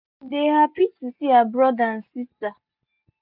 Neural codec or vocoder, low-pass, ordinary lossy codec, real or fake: none; 5.4 kHz; none; real